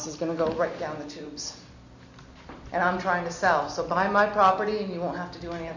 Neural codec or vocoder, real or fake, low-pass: none; real; 7.2 kHz